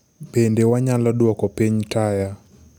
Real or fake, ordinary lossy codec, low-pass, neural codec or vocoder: real; none; none; none